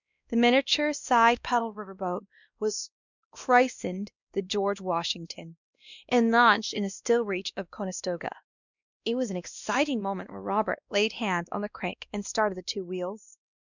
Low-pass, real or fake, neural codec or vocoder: 7.2 kHz; fake; codec, 16 kHz, 1 kbps, X-Codec, WavLM features, trained on Multilingual LibriSpeech